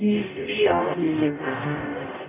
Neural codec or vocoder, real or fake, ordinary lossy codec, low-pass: codec, 44.1 kHz, 0.9 kbps, DAC; fake; none; 3.6 kHz